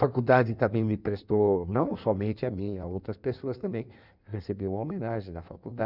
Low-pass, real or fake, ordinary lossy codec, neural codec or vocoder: 5.4 kHz; fake; none; codec, 16 kHz in and 24 kHz out, 1.1 kbps, FireRedTTS-2 codec